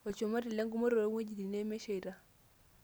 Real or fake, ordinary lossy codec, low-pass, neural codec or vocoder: real; none; none; none